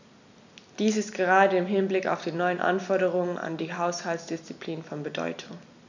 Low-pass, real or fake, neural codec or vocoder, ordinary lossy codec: 7.2 kHz; real; none; none